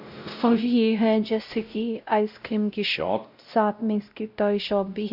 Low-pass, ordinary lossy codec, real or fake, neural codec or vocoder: 5.4 kHz; none; fake; codec, 16 kHz, 0.5 kbps, X-Codec, WavLM features, trained on Multilingual LibriSpeech